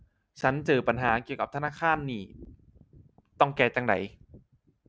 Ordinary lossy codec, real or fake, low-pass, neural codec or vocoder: none; real; none; none